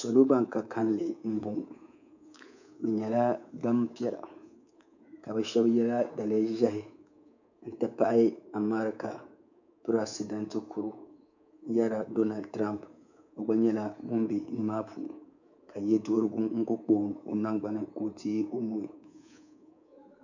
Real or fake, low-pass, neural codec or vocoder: fake; 7.2 kHz; codec, 24 kHz, 3.1 kbps, DualCodec